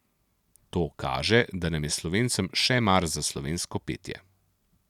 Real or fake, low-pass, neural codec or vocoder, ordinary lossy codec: real; 19.8 kHz; none; none